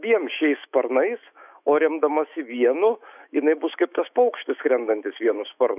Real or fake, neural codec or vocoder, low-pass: fake; autoencoder, 48 kHz, 128 numbers a frame, DAC-VAE, trained on Japanese speech; 3.6 kHz